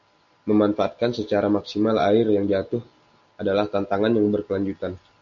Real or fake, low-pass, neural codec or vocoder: real; 7.2 kHz; none